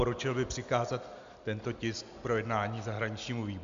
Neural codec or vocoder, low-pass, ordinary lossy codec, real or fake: none; 7.2 kHz; MP3, 64 kbps; real